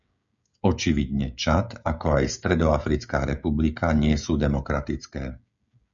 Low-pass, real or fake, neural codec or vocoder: 7.2 kHz; fake; codec, 16 kHz, 16 kbps, FreqCodec, smaller model